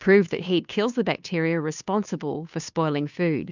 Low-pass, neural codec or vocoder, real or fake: 7.2 kHz; codec, 16 kHz, 2 kbps, FunCodec, trained on Chinese and English, 25 frames a second; fake